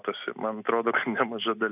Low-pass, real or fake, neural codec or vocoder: 3.6 kHz; real; none